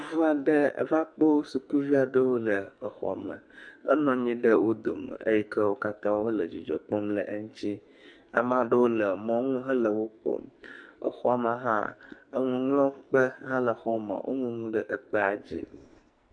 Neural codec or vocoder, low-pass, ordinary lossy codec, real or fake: codec, 44.1 kHz, 2.6 kbps, SNAC; 9.9 kHz; MP3, 64 kbps; fake